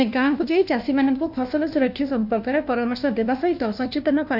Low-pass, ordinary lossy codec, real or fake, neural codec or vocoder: 5.4 kHz; none; fake; codec, 16 kHz, 1 kbps, FunCodec, trained on LibriTTS, 50 frames a second